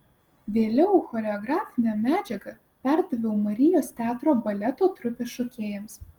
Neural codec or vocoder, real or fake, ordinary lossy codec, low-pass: none; real; Opus, 24 kbps; 19.8 kHz